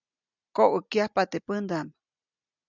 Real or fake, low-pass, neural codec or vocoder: real; 7.2 kHz; none